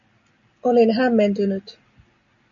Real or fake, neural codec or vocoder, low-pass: real; none; 7.2 kHz